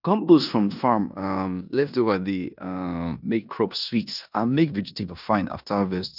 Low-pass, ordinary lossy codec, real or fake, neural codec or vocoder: 5.4 kHz; none; fake; codec, 16 kHz in and 24 kHz out, 0.9 kbps, LongCat-Audio-Codec, four codebook decoder